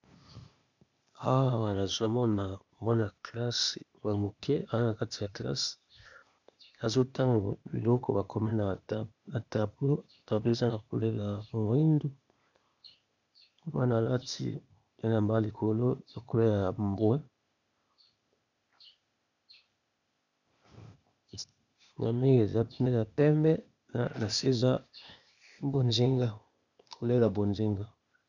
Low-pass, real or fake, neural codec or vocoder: 7.2 kHz; fake; codec, 16 kHz, 0.8 kbps, ZipCodec